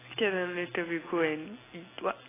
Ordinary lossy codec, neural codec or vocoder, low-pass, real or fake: AAC, 16 kbps; none; 3.6 kHz; real